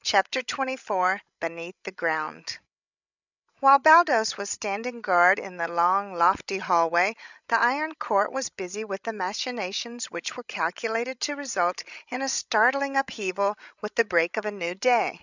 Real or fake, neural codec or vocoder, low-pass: fake; codec, 16 kHz, 16 kbps, FreqCodec, larger model; 7.2 kHz